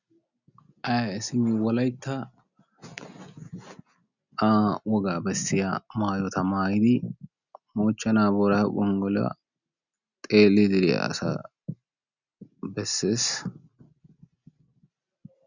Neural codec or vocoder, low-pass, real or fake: none; 7.2 kHz; real